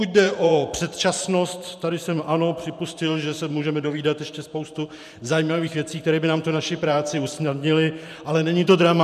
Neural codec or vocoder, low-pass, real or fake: vocoder, 44.1 kHz, 128 mel bands every 512 samples, BigVGAN v2; 14.4 kHz; fake